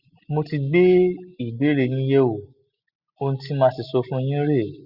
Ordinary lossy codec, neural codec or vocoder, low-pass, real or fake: Opus, 64 kbps; none; 5.4 kHz; real